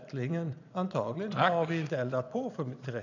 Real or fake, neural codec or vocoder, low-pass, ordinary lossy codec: fake; vocoder, 22.05 kHz, 80 mel bands, Vocos; 7.2 kHz; none